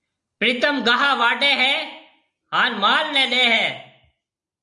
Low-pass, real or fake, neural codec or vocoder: 10.8 kHz; real; none